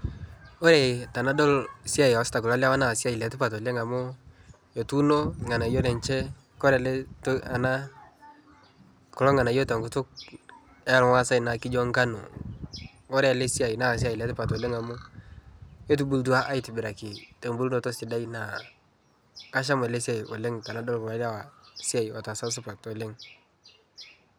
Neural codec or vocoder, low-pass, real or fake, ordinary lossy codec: none; none; real; none